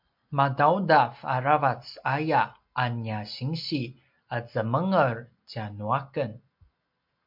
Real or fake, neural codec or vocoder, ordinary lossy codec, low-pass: real; none; MP3, 48 kbps; 5.4 kHz